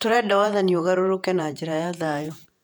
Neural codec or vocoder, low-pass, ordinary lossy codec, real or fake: vocoder, 48 kHz, 128 mel bands, Vocos; 19.8 kHz; MP3, 96 kbps; fake